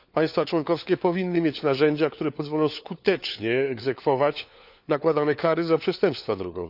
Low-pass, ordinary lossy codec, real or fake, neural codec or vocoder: 5.4 kHz; none; fake; codec, 16 kHz, 4 kbps, FunCodec, trained on LibriTTS, 50 frames a second